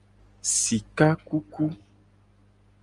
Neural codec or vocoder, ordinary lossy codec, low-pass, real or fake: none; Opus, 32 kbps; 10.8 kHz; real